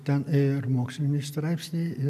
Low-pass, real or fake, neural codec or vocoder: 14.4 kHz; real; none